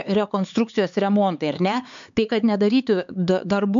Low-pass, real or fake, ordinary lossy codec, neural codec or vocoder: 7.2 kHz; fake; MP3, 64 kbps; codec, 16 kHz, 4 kbps, X-Codec, HuBERT features, trained on LibriSpeech